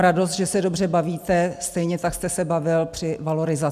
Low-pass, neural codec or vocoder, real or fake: 14.4 kHz; none; real